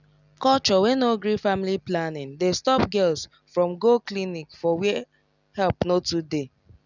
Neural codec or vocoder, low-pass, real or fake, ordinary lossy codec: none; 7.2 kHz; real; none